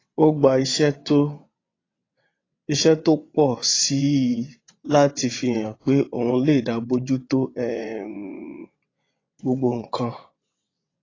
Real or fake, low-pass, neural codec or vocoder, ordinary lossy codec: fake; 7.2 kHz; vocoder, 22.05 kHz, 80 mel bands, WaveNeXt; AAC, 32 kbps